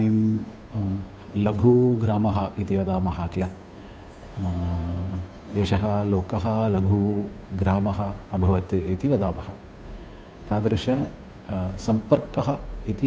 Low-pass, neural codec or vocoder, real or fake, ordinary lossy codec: none; codec, 16 kHz, 2 kbps, FunCodec, trained on Chinese and English, 25 frames a second; fake; none